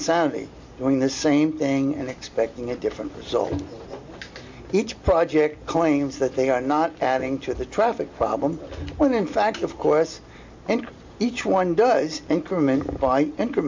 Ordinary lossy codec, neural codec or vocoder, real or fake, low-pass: MP3, 48 kbps; none; real; 7.2 kHz